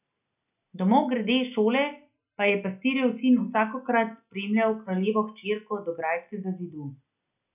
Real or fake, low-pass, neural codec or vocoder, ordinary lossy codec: real; 3.6 kHz; none; none